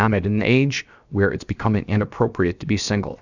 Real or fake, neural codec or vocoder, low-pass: fake; codec, 16 kHz, 0.7 kbps, FocalCodec; 7.2 kHz